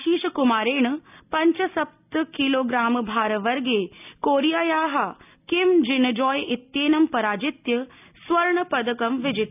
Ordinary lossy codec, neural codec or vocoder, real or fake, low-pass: none; none; real; 3.6 kHz